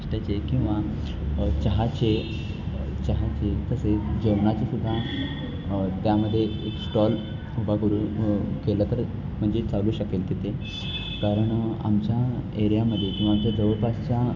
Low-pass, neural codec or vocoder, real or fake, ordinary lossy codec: 7.2 kHz; none; real; none